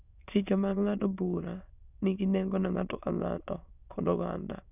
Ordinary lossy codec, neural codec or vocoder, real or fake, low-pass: none; autoencoder, 22.05 kHz, a latent of 192 numbers a frame, VITS, trained on many speakers; fake; 3.6 kHz